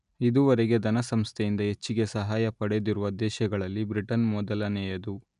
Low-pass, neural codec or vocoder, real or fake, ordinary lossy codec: 10.8 kHz; none; real; none